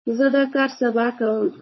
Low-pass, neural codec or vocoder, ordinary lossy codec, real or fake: 7.2 kHz; codec, 16 kHz, 4.8 kbps, FACodec; MP3, 24 kbps; fake